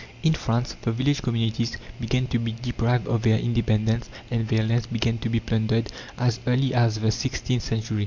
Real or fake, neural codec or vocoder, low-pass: real; none; 7.2 kHz